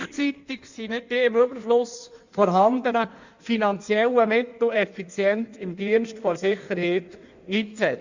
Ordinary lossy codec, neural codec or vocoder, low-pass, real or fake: Opus, 64 kbps; codec, 16 kHz in and 24 kHz out, 1.1 kbps, FireRedTTS-2 codec; 7.2 kHz; fake